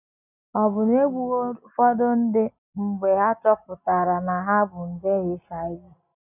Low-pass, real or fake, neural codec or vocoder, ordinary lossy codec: 3.6 kHz; real; none; none